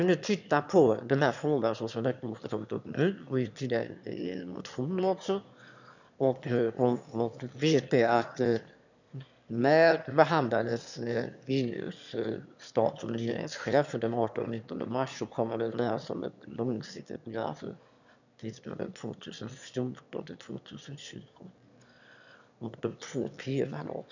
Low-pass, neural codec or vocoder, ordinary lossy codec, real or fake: 7.2 kHz; autoencoder, 22.05 kHz, a latent of 192 numbers a frame, VITS, trained on one speaker; none; fake